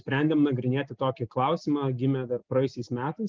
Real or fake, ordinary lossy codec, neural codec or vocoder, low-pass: real; Opus, 32 kbps; none; 7.2 kHz